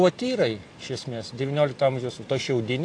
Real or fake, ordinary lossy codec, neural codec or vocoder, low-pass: real; AAC, 48 kbps; none; 9.9 kHz